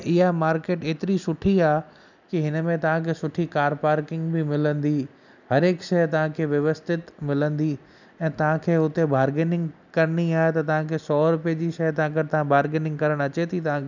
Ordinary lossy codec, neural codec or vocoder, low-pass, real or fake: none; none; 7.2 kHz; real